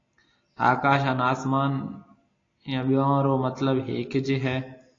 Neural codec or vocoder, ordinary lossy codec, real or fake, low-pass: none; AAC, 32 kbps; real; 7.2 kHz